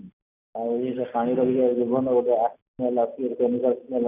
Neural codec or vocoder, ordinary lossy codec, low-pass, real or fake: none; none; 3.6 kHz; real